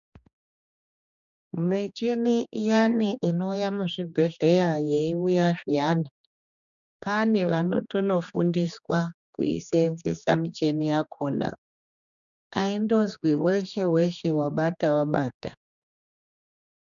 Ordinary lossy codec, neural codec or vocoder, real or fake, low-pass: MP3, 96 kbps; codec, 16 kHz, 2 kbps, X-Codec, HuBERT features, trained on general audio; fake; 7.2 kHz